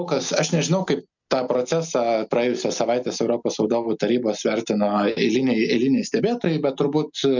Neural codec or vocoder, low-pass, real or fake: none; 7.2 kHz; real